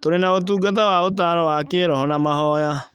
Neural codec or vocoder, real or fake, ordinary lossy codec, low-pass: autoencoder, 48 kHz, 128 numbers a frame, DAC-VAE, trained on Japanese speech; fake; Opus, 32 kbps; 19.8 kHz